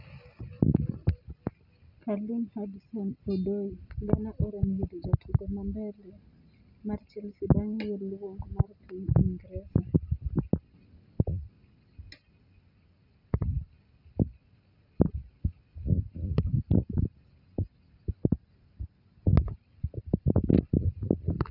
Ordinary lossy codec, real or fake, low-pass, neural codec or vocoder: none; real; 5.4 kHz; none